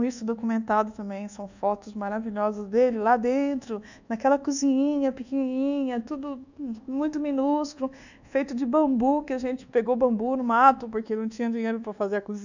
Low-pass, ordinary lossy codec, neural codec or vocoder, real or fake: 7.2 kHz; none; codec, 24 kHz, 1.2 kbps, DualCodec; fake